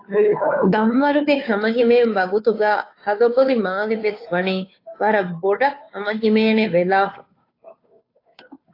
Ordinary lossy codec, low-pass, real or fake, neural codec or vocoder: AAC, 32 kbps; 5.4 kHz; fake; codec, 16 kHz, 2 kbps, FunCodec, trained on Chinese and English, 25 frames a second